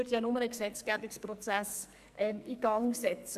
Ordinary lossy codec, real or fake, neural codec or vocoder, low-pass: AAC, 96 kbps; fake; codec, 44.1 kHz, 2.6 kbps, SNAC; 14.4 kHz